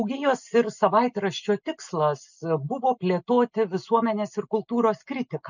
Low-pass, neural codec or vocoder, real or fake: 7.2 kHz; none; real